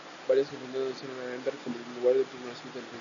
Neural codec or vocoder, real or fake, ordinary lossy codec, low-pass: none; real; MP3, 96 kbps; 7.2 kHz